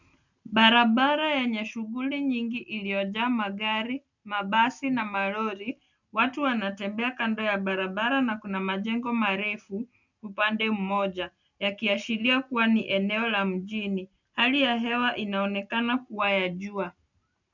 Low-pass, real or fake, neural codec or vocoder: 7.2 kHz; real; none